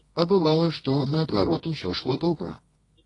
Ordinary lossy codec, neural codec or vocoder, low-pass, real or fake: AAC, 32 kbps; codec, 24 kHz, 0.9 kbps, WavTokenizer, medium music audio release; 10.8 kHz; fake